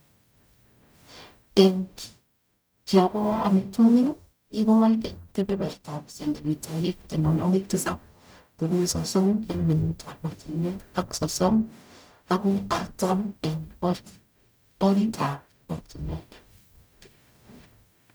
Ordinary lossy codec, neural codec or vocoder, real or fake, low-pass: none; codec, 44.1 kHz, 0.9 kbps, DAC; fake; none